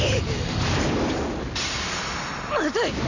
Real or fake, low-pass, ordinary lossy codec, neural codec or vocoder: real; 7.2 kHz; none; none